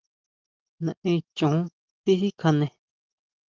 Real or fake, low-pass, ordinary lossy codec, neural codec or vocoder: real; 7.2 kHz; Opus, 24 kbps; none